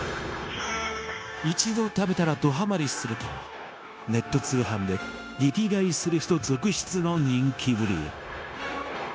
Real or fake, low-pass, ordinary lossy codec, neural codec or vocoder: fake; none; none; codec, 16 kHz, 0.9 kbps, LongCat-Audio-Codec